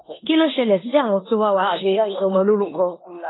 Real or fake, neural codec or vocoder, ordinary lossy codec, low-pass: fake; codec, 16 kHz in and 24 kHz out, 0.9 kbps, LongCat-Audio-Codec, four codebook decoder; AAC, 16 kbps; 7.2 kHz